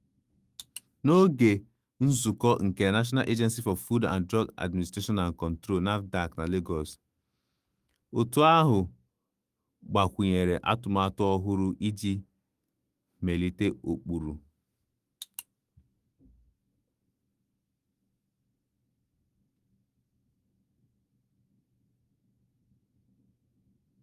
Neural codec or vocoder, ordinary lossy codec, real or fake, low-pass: autoencoder, 48 kHz, 128 numbers a frame, DAC-VAE, trained on Japanese speech; Opus, 32 kbps; fake; 14.4 kHz